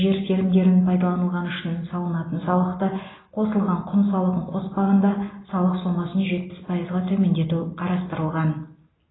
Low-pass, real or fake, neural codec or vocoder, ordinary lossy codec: 7.2 kHz; real; none; AAC, 16 kbps